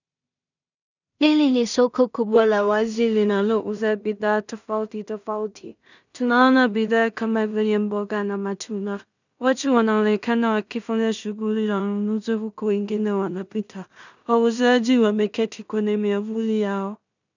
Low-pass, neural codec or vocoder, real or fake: 7.2 kHz; codec, 16 kHz in and 24 kHz out, 0.4 kbps, LongCat-Audio-Codec, two codebook decoder; fake